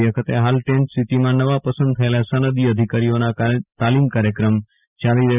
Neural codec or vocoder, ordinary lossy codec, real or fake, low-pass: none; none; real; 3.6 kHz